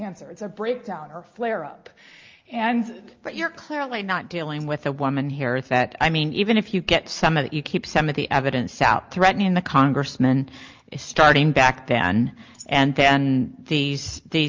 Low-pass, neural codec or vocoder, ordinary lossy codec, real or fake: 7.2 kHz; none; Opus, 24 kbps; real